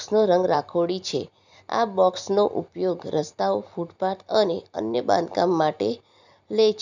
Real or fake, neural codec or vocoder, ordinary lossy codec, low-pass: fake; vocoder, 44.1 kHz, 128 mel bands every 256 samples, BigVGAN v2; none; 7.2 kHz